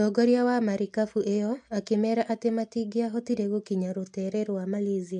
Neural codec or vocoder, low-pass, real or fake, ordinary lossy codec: none; 10.8 kHz; real; MP3, 64 kbps